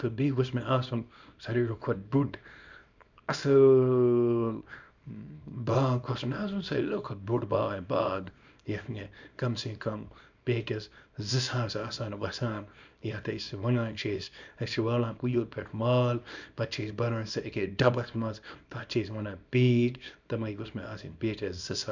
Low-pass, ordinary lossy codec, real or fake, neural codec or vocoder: 7.2 kHz; none; fake; codec, 24 kHz, 0.9 kbps, WavTokenizer, small release